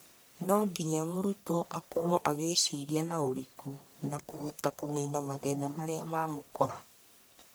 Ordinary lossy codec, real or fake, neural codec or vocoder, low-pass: none; fake; codec, 44.1 kHz, 1.7 kbps, Pupu-Codec; none